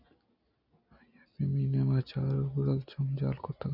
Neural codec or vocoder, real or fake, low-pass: none; real; 5.4 kHz